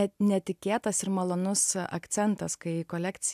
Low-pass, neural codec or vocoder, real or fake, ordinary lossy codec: 14.4 kHz; none; real; AAC, 96 kbps